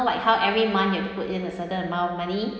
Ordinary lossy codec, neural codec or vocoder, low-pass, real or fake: none; none; none; real